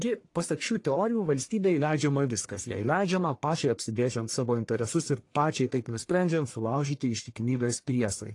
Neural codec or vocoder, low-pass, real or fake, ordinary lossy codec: codec, 44.1 kHz, 1.7 kbps, Pupu-Codec; 10.8 kHz; fake; AAC, 48 kbps